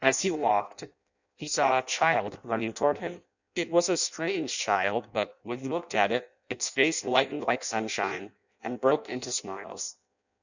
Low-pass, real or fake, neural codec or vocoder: 7.2 kHz; fake; codec, 16 kHz in and 24 kHz out, 0.6 kbps, FireRedTTS-2 codec